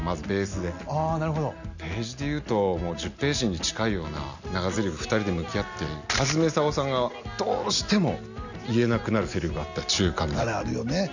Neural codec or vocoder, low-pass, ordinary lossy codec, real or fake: none; 7.2 kHz; none; real